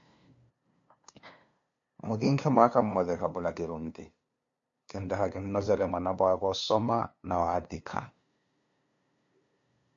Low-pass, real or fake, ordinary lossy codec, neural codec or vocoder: 7.2 kHz; fake; MP3, 48 kbps; codec, 16 kHz, 2 kbps, FunCodec, trained on LibriTTS, 25 frames a second